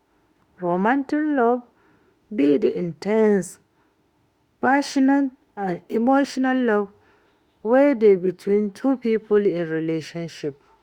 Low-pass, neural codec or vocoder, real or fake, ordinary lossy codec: 19.8 kHz; autoencoder, 48 kHz, 32 numbers a frame, DAC-VAE, trained on Japanese speech; fake; Opus, 64 kbps